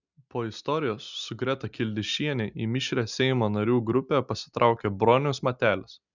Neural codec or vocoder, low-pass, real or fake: none; 7.2 kHz; real